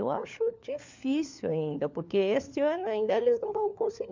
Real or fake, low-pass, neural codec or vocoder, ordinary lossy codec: fake; 7.2 kHz; codec, 16 kHz, 4 kbps, FunCodec, trained on LibriTTS, 50 frames a second; MP3, 64 kbps